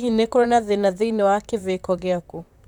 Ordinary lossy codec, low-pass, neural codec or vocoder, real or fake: none; 19.8 kHz; vocoder, 44.1 kHz, 128 mel bands, Pupu-Vocoder; fake